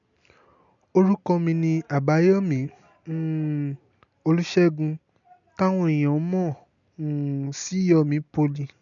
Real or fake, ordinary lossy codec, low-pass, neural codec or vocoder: real; none; 7.2 kHz; none